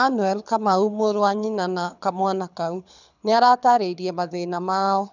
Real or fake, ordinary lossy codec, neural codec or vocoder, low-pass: fake; none; codec, 24 kHz, 6 kbps, HILCodec; 7.2 kHz